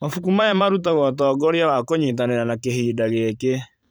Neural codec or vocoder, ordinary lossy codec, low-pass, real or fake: vocoder, 44.1 kHz, 128 mel bands every 512 samples, BigVGAN v2; none; none; fake